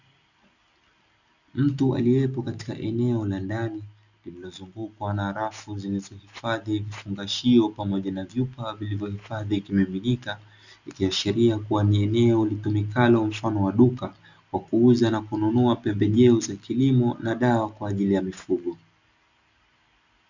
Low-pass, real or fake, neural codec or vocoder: 7.2 kHz; real; none